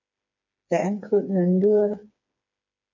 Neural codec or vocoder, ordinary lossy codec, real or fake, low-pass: codec, 16 kHz, 4 kbps, FreqCodec, smaller model; MP3, 64 kbps; fake; 7.2 kHz